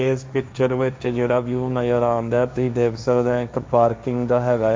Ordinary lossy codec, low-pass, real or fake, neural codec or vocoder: none; none; fake; codec, 16 kHz, 1.1 kbps, Voila-Tokenizer